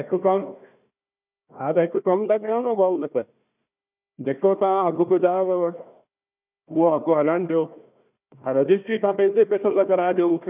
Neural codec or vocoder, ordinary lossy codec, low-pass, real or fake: codec, 16 kHz, 1 kbps, FunCodec, trained on Chinese and English, 50 frames a second; none; 3.6 kHz; fake